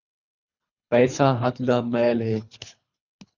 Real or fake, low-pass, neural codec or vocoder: fake; 7.2 kHz; codec, 24 kHz, 3 kbps, HILCodec